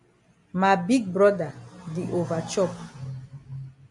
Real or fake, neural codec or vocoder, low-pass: real; none; 10.8 kHz